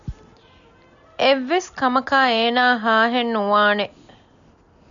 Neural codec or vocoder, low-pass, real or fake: none; 7.2 kHz; real